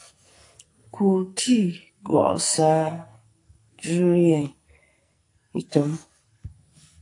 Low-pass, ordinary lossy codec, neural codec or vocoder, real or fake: 10.8 kHz; AAC, 64 kbps; codec, 44.1 kHz, 2.6 kbps, SNAC; fake